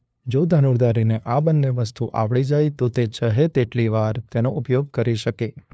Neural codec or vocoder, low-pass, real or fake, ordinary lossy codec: codec, 16 kHz, 2 kbps, FunCodec, trained on LibriTTS, 25 frames a second; none; fake; none